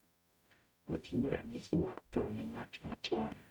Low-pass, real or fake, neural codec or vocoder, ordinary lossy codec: 19.8 kHz; fake; codec, 44.1 kHz, 0.9 kbps, DAC; none